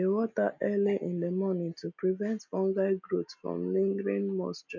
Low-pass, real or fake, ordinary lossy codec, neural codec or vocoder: 7.2 kHz; real; MP3, 48 kbps; none